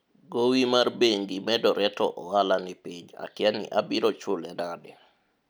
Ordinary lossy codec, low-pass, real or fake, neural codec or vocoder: none; none; real; none